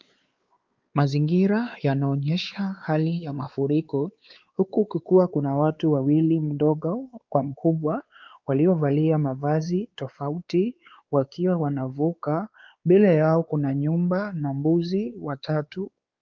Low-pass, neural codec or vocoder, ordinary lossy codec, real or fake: 7.2 kHz; codec, 16 kHz, 4 kbps, X-Codec, WavLM features, trained on Multilingual LibriSpeech; Opus, 24 kbps; fake